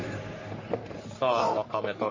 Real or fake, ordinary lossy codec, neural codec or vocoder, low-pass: fake; MP3, 32 kbps; codec, 44.1 kHz, 3.4 kbps, Pupu-Codec; 7.2 kHz